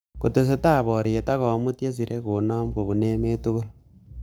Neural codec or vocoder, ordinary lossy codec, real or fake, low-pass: codec, 44.1 kHz, 7.8 kbps, Pupu-Codec; none; fake; none